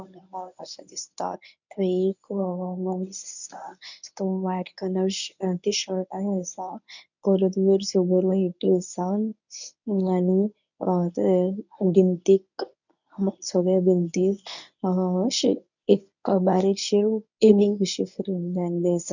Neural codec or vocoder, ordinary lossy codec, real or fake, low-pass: codec, 24 kHz, 0.9 kbps, WavTokenizer, medium speech release version 1; none; fake; 7.2 kHz